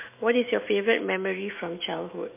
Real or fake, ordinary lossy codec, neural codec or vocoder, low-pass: fake; MP3, 24 kbps; autoencoder, 48 kHz, 128 numbers a frame, DAC-VAE, trained on Japanese speech; 3.6 kHz